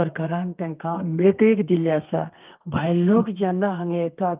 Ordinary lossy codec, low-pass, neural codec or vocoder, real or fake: Opus, 32 kbps; 3.6 kHz; codec, 32 kHz, 1.9 kbps, SNAC; fake